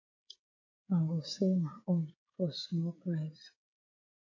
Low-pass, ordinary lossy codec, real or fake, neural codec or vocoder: 7.2 kHz; MP3, 32 kbps; fake; codec, 16 kHz, 8 kbps, FreqCodec, smaller model